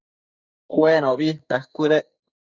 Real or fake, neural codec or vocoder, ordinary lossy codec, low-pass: fake; codec, 44.1 kHz, 2.6 kbps, SNAC; Opus, 64 kbps; 7.2 kHz